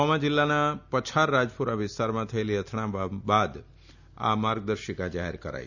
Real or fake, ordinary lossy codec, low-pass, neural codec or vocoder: real; none; 7.2 kHz; none